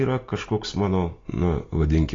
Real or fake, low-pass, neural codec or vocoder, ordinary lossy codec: real; 7.2 kHz; none; AAC, 32 kbps